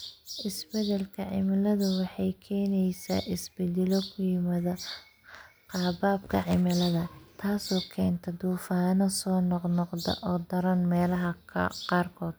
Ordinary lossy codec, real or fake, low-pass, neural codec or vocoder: none; real; none; none